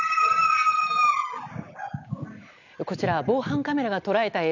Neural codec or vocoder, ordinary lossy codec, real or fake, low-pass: none; none; real; 7.2 kHz